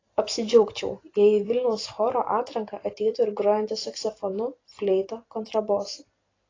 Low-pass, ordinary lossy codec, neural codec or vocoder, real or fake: 7.2 kHz; AAC, 32 kbps; vocoder, 44.1 kHz, 128 mel bands every 256 samples, BigVGAN v2; fake